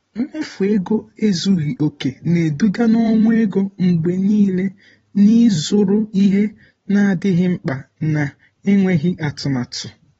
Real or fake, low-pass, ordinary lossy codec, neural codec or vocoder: fake; 19.8 kHz; AAC, 24 kbps; vocoder, 44.1 kHz, 128 mel bands every 512 samples, BigVGAN v2